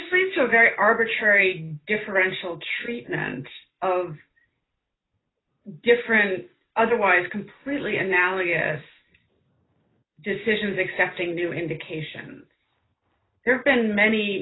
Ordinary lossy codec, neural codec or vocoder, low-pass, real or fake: AAC, 16 kbps; none; 7.2 kHz; real